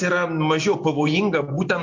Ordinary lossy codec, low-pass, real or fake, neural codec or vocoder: MP3, 48 kbps; 7.2 kHz; real; none